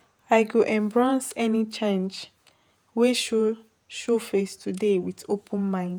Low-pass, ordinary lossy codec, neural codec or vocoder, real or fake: none; none; vocoder, 48 kHz, 128 mel bands, Vocos; fake